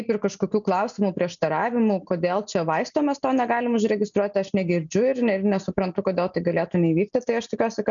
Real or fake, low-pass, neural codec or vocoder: real; 7.2 kHz; none